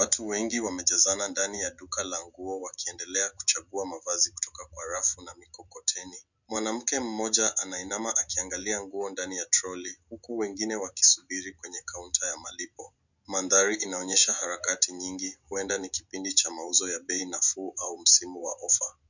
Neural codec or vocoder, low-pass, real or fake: none; 7.2 kHz; real